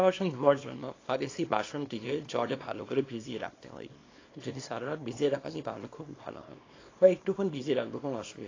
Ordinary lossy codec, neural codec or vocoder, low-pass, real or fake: AAC, 32 kbps; codec, 24 kHz, 0.9 kbps, WavTokenizer, small release; 7.2 kHz; fake